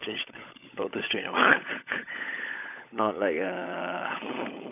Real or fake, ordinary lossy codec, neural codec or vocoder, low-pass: fake; none; codec, 16 kHz, 16 kbps, FunCodec, trained on LibriTTS, 50 frames a second; 3.6 kHz